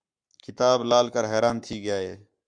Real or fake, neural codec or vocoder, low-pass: fake; codec, 44.1 kHz, 7.8 kbps, Pupu-Codec; 9.9 kHz